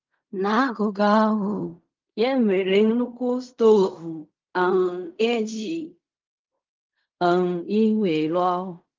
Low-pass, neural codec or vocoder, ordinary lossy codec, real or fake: 7.2 kHz; codec, 16 kHz in and 24 kHz out, 0.4 kbps, LongCat-Audio-Codec, fine tuned four codebook decoder; Opus, 24 kbps; fake